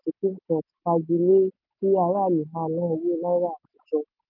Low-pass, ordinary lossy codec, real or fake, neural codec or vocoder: 5.4 kHz; none; real; none